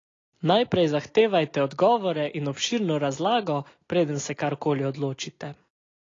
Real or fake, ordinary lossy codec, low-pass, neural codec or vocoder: real; AAC, 32 kbps; 7.2 kHz; none